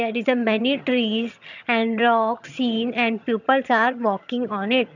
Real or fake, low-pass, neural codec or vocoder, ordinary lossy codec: fake; 7.2 kHz; vocoder, 22.05 kHz, 80 mel bands, HiFi-GAN; none